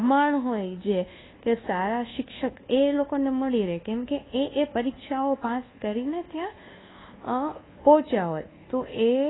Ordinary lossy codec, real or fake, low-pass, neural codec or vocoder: AAC, 16 kbps; fake; 7.2 kHz; codec, 24 kHz, 1.2 kbps, DualCodec